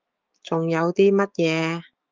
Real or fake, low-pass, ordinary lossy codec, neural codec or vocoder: fake; 7.2 kHz; Opus, 24 kbps; autoencoder, 48 kHz, 128 numbers a frame, DAC-VAE, trained on Japanese speech